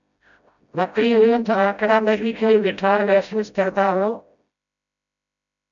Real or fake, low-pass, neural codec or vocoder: fake; 7.2 kHz; codec, 16 kHz, 0.5 kbps, FreqCodec, smaller model